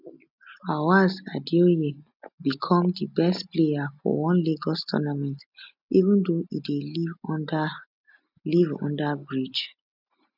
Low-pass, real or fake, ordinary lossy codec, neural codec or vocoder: 5.4 kHz; real; none; none